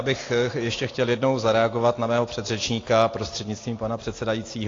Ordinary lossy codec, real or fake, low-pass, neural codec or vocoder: AAC, 32 kbps; real; 7.2 kHz; none